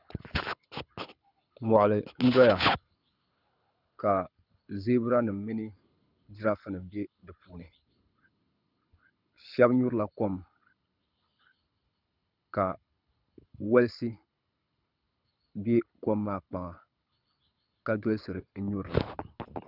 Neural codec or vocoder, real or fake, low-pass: codec, 24 kHz, 6 kbps, HILCodec; fake; 5.4 kHz